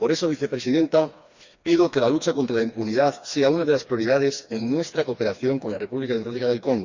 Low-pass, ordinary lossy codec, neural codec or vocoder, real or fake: 7.2 kHz; Opus, 64 kbps; codec, 16 kHz, 2 kbps, FreqCodec, smaller model; fake